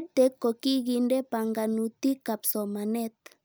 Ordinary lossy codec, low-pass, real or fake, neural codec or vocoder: none; none; real; none